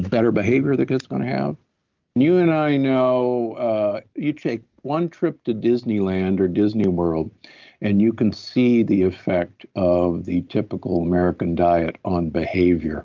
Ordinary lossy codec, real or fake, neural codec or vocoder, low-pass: Opus, 32 kbps; real; none; 7.2 kHz